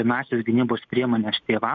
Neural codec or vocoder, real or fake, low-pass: none; real; 7.2 kHz